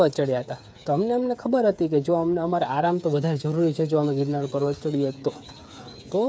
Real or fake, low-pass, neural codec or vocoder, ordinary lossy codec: fake; none; codec, 16 kHz, 8 kbps, FreqCodec, smaller model; none